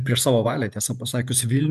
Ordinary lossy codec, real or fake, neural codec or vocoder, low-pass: MP3, 96 kbps; fake; vocoder, 48 kHz, 128 mel bands, Vocos; 14.4 kHz